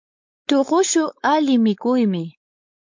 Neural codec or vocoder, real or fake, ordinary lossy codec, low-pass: codec, 16 kHz, 4.8 kbps, FACodec; fake; MP3, 48 kbps; 7.2 kHz